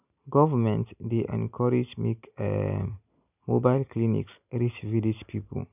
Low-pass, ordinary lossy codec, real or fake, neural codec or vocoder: 3.6 kHz; none; real; none